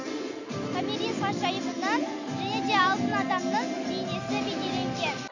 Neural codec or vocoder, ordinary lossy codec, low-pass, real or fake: none; none; 7.2 kHz; real